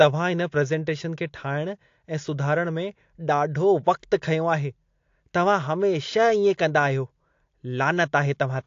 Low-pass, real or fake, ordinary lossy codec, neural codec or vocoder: 7.2 kHz; real; AAC, 48 kbps; none